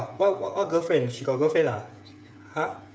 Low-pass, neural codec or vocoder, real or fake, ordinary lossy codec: none; codec, 16 kHz, 8 kbps, FreqCodec, smaller model; fake; none